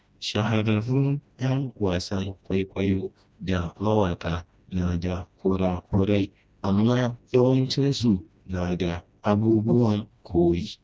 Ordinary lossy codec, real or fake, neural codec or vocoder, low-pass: none; fake; codec, 16 kHz, 1 kbps, FreqCodec, smaller model; none